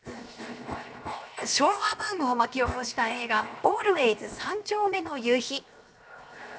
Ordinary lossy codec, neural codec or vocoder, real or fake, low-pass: none; codec, 16 kHz, 0.7 kbps, FocalCodec; fake; none